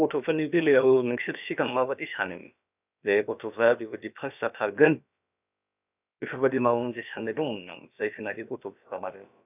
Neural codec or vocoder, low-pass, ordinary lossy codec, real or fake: codec, 16 kHz, about 1 kbps, DyCAST, with the encoder's durations; 3.6 kHz; none; fake